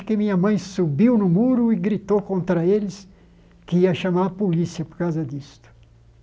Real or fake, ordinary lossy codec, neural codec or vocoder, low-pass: real; none; none; none